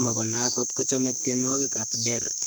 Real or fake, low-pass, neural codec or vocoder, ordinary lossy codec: fake; none; codec, 44.1 kHz, 2.6 kbps, SNAC; none